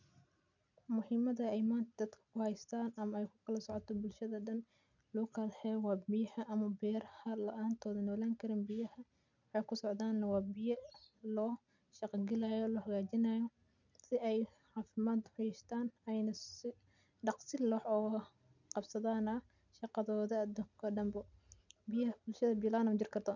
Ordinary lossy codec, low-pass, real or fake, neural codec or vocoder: none; 7.2 kHz; real; none